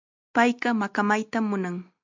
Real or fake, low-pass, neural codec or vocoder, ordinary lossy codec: real; 7.2 kHz; none; MP3, 64 kbps